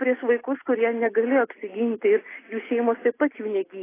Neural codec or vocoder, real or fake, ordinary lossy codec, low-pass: none; real; AAC, 16 kbps; 3.6 kHz